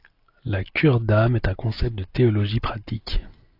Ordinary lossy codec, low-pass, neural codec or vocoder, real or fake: AAC, 32 kbps; 5.4 kHz; none; real